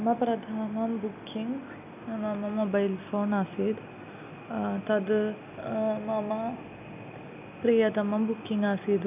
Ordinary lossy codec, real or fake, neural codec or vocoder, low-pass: AAC, 32 kbps; real; none; 3.6 kHz